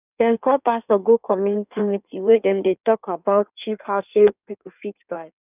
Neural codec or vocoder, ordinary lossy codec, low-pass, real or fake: codec, 16 kHz in and 24 kHz out, 1.1 kbps, FireRedTTS-2 codec; none; 3.6 kHz; fake